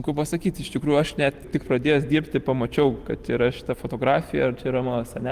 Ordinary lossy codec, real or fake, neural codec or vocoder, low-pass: Opus, 24 kbps; real; none; 14.4 kHz